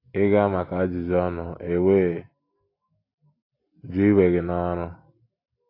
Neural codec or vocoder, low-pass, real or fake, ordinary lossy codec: none; 5.4 kHz; real; AAC, 24 kbps